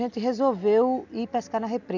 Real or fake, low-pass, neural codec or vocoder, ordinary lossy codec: real; 7.2 kHz; none; none